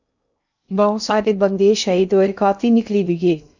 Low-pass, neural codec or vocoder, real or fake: 7.2 kHz; codec, 16 kHz in and 24 kHz out, 0.6 kbps, FocalCodec, streaming, 2048 codes; fake